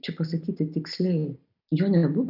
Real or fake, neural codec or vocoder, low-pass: real; none; 5.4 kHz